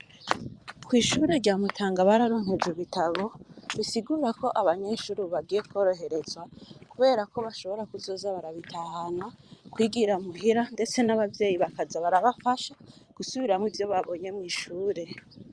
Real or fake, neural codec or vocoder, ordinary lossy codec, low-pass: fake; vocoder, 22.05 kHz, 80 mel bands, Vocos; Opus, 64 kbps; 9.9 kHz